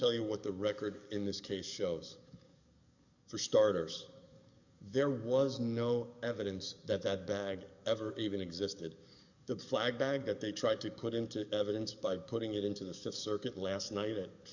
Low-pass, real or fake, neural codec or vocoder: 7.2 kHz; fake; codec, 44.1 kHz, 7.8 kbps, DAC